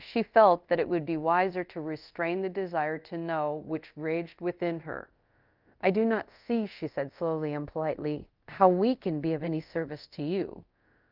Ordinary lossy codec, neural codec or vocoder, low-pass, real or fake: Opus, 24 kbps; codec, 24 kHz, 0.5 kbps, DualCodec; 5.4 kHz; fake